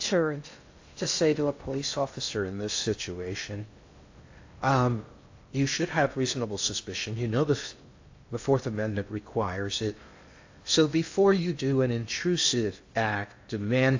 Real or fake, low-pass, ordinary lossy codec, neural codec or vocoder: fake; 7.2 kHz; AAC, 48 kbps; codec, 16 kHz in and 24 kHz out, 0.6 kbps, FocalCodec, streaming, 2048 codes